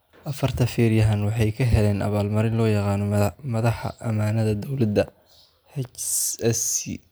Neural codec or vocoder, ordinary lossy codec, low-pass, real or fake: none; none; none; real